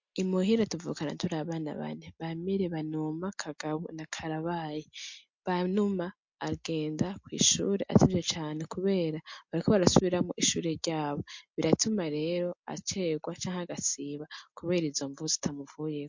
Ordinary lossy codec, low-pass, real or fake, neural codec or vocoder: MP3, 48 kbps; 7.2 kHz; real; none